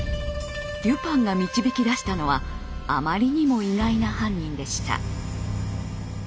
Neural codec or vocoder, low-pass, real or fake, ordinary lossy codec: none; none; real; none